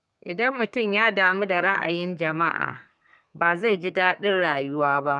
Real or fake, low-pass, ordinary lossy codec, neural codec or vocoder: fake; 10.8 kHz; none; codec, 32 kHz, 1.9 kbps, SNAC